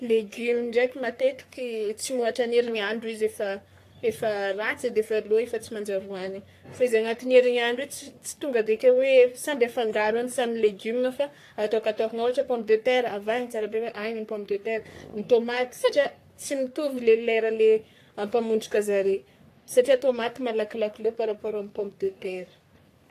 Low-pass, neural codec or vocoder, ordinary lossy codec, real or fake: 14.4 kHz; codec, 44.1 kHz, 3.4 kbps, Pupu-Codec; AAC, 64 kbps; fake